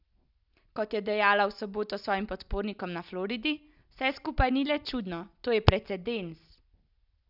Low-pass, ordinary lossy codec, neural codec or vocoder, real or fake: 5.4 kHz; none; none; real